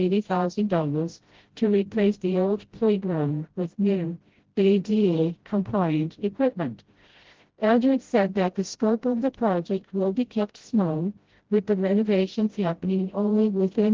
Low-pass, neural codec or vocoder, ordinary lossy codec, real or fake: 7.2 kHz; codec, 16 kHz, 0.5 kbps, FreqCodec, smaller model; Opus, 16 kbps; fake